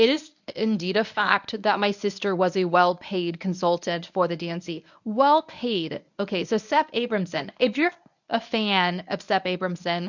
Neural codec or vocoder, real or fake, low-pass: codec, 24 kHz, 0.9 kbps, WavTokenizer, medium speech release version 1; fake; 7.2 kHz